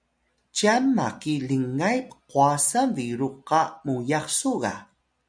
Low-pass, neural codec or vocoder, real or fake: 9.9 kHz; none; real